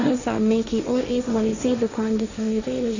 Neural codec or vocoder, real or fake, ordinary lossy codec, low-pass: codec, 16 kHz, 1.1 kbps, Voila-Tokenizer; fake; none; 7.2 kHz